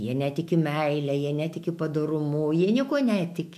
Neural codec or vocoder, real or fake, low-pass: autoencoder, 48 kHz, 128 numbers a frame, DAC-VAE, trained on Japanese speech; fake; 14.4 kHz